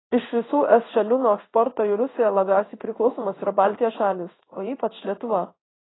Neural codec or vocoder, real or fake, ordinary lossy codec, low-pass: codec, 16 kHz in and 24 kHz out, 1 kbps, XY-Tokenizer; fake; AAC, 16 kbps; 7.2 kHz